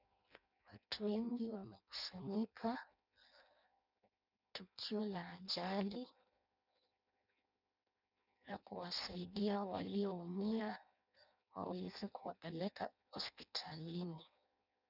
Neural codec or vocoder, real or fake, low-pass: codec, 16 kHz in and 24 kHz out, 0.6 kbps, FireRedTTS-2 codec; fake; 5.4 kHz